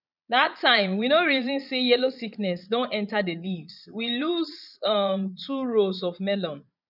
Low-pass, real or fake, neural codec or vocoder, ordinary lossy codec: 5.4 kHz; fake; vocoder, 22.05 kHz, 80 mel bands, Vocos; none